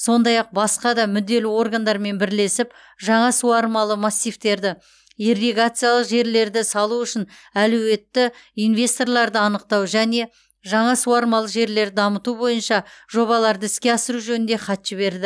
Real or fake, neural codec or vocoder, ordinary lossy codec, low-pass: real; none; none; none